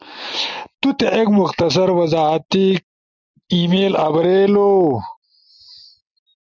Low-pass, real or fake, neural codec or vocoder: 7.2 kHz; real; none